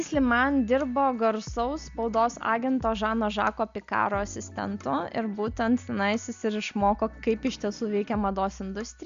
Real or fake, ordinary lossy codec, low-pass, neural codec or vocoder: real; Opus, 64 kbps; 7.2 kHz; none